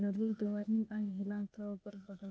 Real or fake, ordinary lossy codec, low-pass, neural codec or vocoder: fake; none; none; codec, 16 kHz, 0.8 kbps, ZipCodec